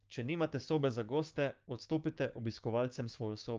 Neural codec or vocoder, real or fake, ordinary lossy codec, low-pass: codec, 16 kHz, 6 kbps, DAC; fake; Opus, 24 kbps; 7.2 kHz